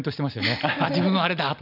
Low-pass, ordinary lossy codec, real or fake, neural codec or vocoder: 5.4 kHz; none; real; none